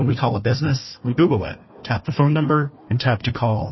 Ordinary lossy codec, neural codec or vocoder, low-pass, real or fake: MP3, 24 kbps; codec, 16 kHz, 1 kbps, FunCodec, trained on Chinese and English, 50 frames a second; 7.2 kHz; fake